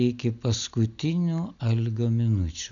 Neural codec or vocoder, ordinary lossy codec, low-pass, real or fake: none; MP3, 96 kbps; 7.2 kHz; real